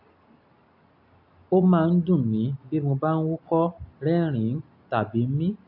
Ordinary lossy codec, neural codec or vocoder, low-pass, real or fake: AAC, 48 kbps; none; 5.4 kHz; real